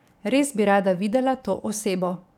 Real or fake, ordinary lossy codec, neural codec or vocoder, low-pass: fake; none; codec, 44.1 kHz, 7.8 kbps, DAC; 19.8 kHz